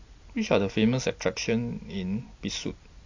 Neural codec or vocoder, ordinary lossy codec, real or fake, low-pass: none; AAC, 48 kbps; real; 7.2 kHz